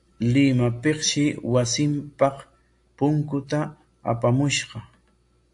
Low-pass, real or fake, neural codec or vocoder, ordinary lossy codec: 10.8 kHz; real; none; AAC, 64 kbps